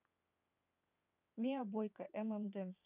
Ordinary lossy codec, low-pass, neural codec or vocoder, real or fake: none; 3.6 kHz; vocoder, 22.05 kHz, 80 mel bands, WaveNeXt; fake